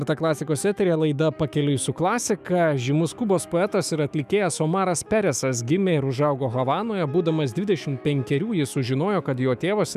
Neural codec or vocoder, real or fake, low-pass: autoencoder, 48 kHz, 128 numbers a frame, DAC-VAE, trained on Japanese speech; fake; 14.4 kHz